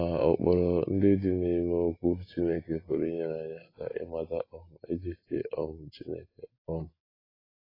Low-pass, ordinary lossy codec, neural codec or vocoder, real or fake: 5.4 kHz; AAC, 24 kbps; none; real